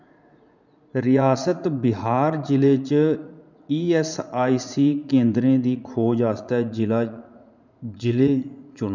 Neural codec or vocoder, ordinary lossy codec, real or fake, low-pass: vocoder, 44.1 kHz, 80 mel bands, Vocos; none; fake; 7.2 kHz